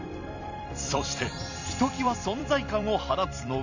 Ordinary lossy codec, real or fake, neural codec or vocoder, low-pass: none; fake; vocoder, 44.1 kHz, 128 mel bands every 256 samples, BigVGAN v2; 7.2 kHz